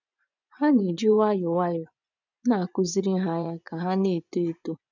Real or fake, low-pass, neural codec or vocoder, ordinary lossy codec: fake; 7.2 kHz; vocoder, 24 kHz, 100 mel bands, Vocos; none